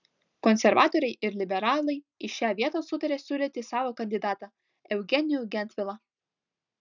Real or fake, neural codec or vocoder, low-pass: real; none; 7.2 kHz